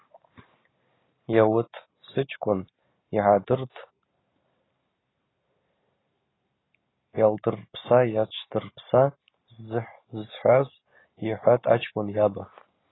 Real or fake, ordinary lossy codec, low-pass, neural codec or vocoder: real; AAC, 16 kbps; 7.2 kHz; none